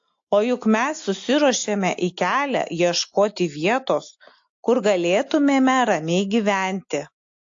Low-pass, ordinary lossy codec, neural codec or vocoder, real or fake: 7.2 kHz; AAC, 48 kbps; none; real